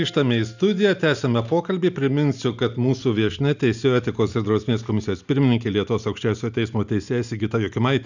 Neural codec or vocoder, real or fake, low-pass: none; real; 7.2 kHz